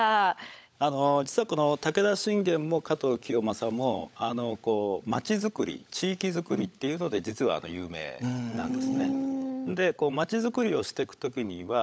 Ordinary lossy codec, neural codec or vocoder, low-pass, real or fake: none; codec, 16 kHz, 16 kbps, FunCodec, trained on LibriTTS, 50 frames a second; none; fake